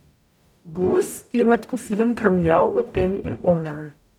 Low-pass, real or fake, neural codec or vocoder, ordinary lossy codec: 19.8 kHz; fake; codec, 44.1 kHz, 0.9 kbps, DAC; none